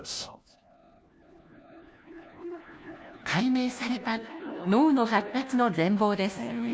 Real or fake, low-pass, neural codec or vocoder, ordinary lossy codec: fake; none; codec, 16 kHz, 1 kbps, FunCodec, trained on LibriTTS, 50 frames a second; none